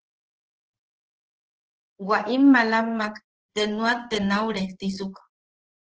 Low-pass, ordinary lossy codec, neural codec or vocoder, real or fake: 7.2 kHz; Opus, 16 kbps; codec, 16 kHz in and 24 kHz out, 1 kbps, XY-Tokenizer; fake